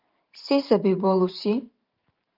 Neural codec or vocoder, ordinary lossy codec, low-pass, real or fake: vocoder, 44.1 kHz, 128 mel bands every 512 samples, BigVGAN v2; Opus, 24 kbps; 5.4 kHz; fake